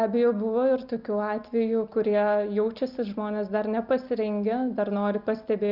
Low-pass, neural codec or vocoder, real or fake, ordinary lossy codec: 5.4 kHz; none; real; Opus, 24 kbps